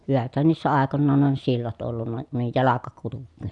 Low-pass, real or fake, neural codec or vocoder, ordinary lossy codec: 10.8 kHz; real; none; none